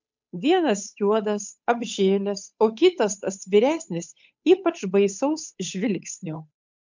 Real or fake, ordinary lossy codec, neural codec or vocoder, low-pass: fake; MP3, 64 kbps; codec, 16 kHz, 8 kbps, FunCodec, trained on Chinese and English, 25 frames a second; 7.2 kHz